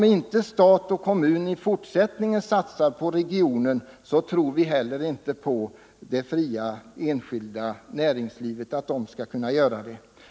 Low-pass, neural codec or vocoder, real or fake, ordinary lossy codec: none; none; real; none